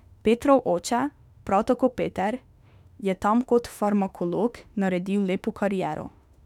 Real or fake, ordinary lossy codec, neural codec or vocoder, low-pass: fake; none; autoencoder, 48 kHz, 32 numbers a frame, DAC-VAE, trained on Japanese speech; 19.8 kHz